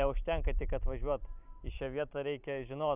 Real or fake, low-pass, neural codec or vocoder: fake; 3.6 kHz; vocoder, 44.1 kHz, 128 mel bands every 512 samples, BigVGAN v2